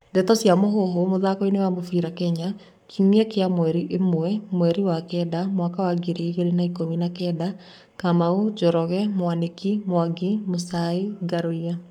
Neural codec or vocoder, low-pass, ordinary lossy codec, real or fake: codec, 44.1 kHz, 7.8 kbps, Pupu-Codec; 19.8 kHz; none; fake